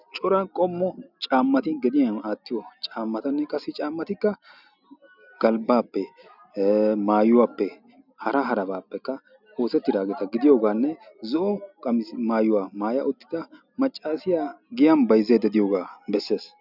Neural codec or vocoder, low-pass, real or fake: none; 5.4 kHz; real